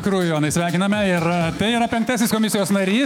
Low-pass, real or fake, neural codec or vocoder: 19.8 kHz; fake; autoencoder, 48 kHz, 128 numbers a frame, DAC-VAE, trained on Japanese speech